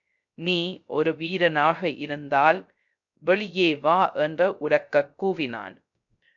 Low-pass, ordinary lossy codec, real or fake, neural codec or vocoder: 7.2 kHz; AAC, 64 kbps; fake; codec, 16 kHz, 0.3 kbps, FocalCodec